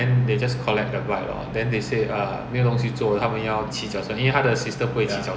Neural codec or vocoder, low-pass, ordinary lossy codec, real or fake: none; none; none; real